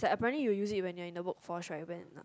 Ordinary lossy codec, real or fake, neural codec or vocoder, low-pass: none; real; none; none